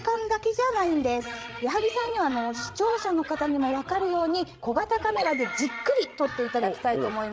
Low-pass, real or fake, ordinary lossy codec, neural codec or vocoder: none; fake; none; codec, 16 kHz, 8 kbps, FreqCodec, larger model